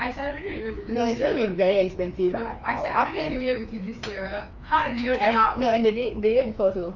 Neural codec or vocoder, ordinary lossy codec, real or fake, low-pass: codec, 16 kHz, 2 kbps, FreqCodec, larger model; none; fake; 7.2 kHz